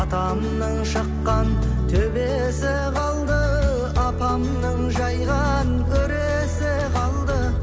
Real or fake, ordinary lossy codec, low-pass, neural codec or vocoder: real; none; none; none